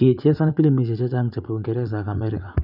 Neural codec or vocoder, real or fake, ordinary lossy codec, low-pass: vocoder, 44.1 kHz, 80 mel bands, Vocos; fake; none; 5.4 kHz